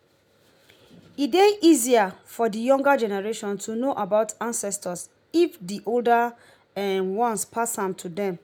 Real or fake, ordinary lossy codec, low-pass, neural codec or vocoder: real; none; none; none